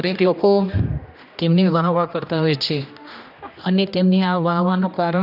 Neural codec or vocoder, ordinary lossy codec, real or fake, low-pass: codec, 16 kHz, 1 kbps, X-Codec, HuBERT features, trained on general audio; none; fake; 5.4 kHz